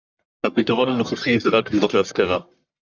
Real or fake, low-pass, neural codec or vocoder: fake; 7.2 kHz; codec, 44.1 kHz, 1.7 kbps, Pupu-Codec